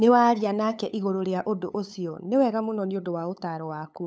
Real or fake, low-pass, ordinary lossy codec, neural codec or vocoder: fake; none; none; codec, 16 kHz, 8 kbps, FunCodec, trained on LibriTTS, 25 frames a second